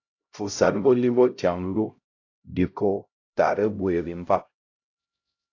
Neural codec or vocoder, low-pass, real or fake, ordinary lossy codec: codec, 16 kHz, 0.5 kbps, X-Codec, HuBERT features, trained on LibriSpeech; 7.2 kHz; fake; AAC, 48 kbps